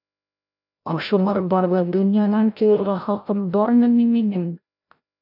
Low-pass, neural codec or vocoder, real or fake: 5.4 kHz; codec, 16 kHz, 0.5 kbps, FreqCodec, larger model; fake